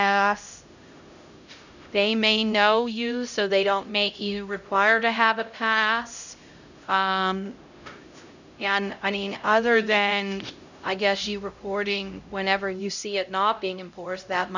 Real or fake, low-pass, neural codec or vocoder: fake; 7.2 kHz; codec, 16 kHz, 0.5 kbps, X-Codec, HuBERT features, trained on LibriSpeech